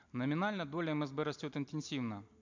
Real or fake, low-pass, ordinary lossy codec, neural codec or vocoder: real; 7.2 kHz; MP3, 64 kbps; none